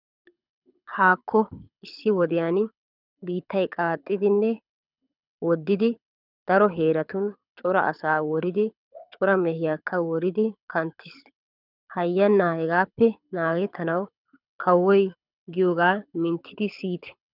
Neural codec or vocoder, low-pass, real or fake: codec, 24 kHz, 6 kbps, HILCodec; 5.4 kHz; fake